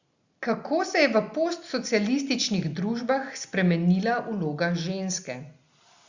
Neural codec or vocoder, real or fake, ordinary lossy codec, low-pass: none; real; Opus, 64 kbps; 7.2 kHz